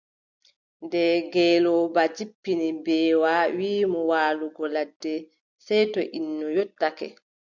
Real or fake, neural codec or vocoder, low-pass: real; none; 7.2 kHz